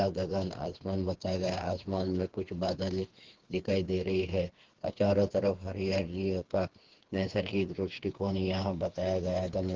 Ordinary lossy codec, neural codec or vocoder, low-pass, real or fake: Opus, 16 kbps; codec, 16 kHz, 4 kbps, FreqCodec, smaller model; 7.2 kHz; fake